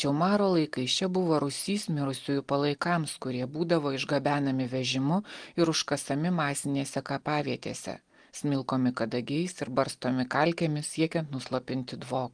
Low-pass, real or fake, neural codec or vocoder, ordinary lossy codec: 9.9 kHz; real; none; Opus, 24 kbps